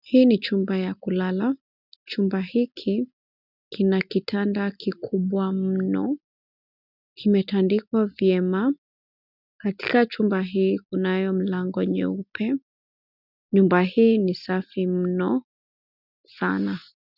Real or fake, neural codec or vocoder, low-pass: real; none; 5.4 kHz